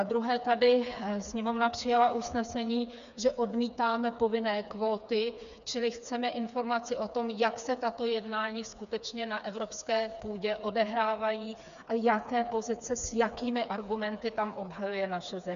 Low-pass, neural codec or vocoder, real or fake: 7.2 kHz; codec, 16 kHz, 4 kbps, FreqCodec, smaller model; fake